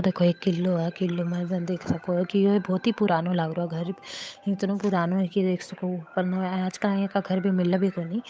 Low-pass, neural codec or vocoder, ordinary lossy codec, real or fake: none; codec, 16 kHz, 8 kbps, FunCodec, trained on Chinese and English, 25 frames a second; none; fake